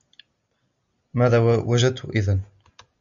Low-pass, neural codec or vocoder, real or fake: 7.2 kHz; none; real